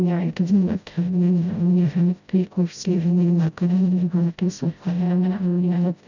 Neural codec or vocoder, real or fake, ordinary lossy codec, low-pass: codec, 16 kHz, 0.5 kbps, FreqCodec, smaller model; fake; none; 7.2 kHz